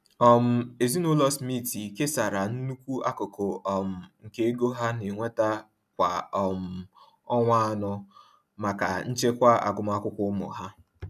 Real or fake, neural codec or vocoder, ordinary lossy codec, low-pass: real; none; none; 14.4 kHz